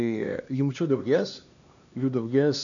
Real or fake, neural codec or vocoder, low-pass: fake; codec, 16 kHz, 2 kbps, X-Codec, HuBERT features, trained on LibriSpeech; 7.2 kHz